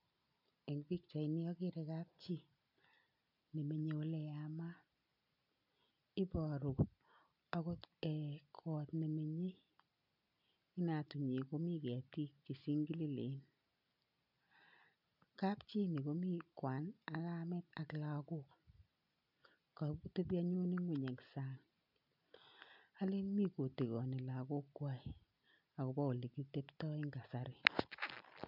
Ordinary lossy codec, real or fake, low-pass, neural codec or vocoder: none; real; 5.4 kHz; none